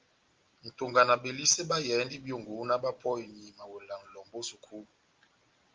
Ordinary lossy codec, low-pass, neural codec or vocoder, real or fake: Opus, 24 kbps; 7.2 kHz; none; real